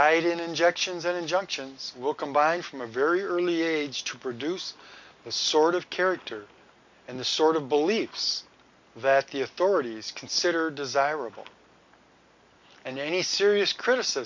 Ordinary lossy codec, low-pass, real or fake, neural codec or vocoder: MP3, 64 kbps; 7.2 kHz; real; none